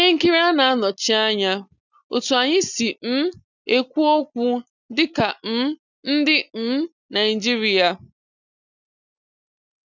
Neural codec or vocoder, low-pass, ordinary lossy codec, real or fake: none; 7.2 kHz; none; real